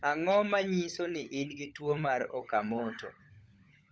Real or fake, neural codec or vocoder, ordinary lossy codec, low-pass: fake; codec, 16 kHz, 16 kbps, FunCodec, trained on LibriTTS, 50 frames a second; none; none